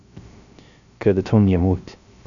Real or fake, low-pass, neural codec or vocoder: fake; 7.2 kHz; codec, 16 kHz, 0.3 kbps, FocalCodec